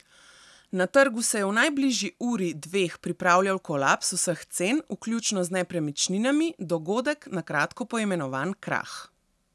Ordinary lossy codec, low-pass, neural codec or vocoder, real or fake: none; none; none; real